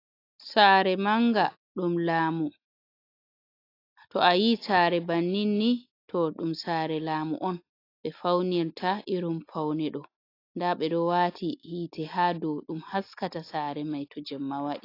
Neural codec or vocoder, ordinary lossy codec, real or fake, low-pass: none; AAC, 32 kbps; real; 5.4 kHz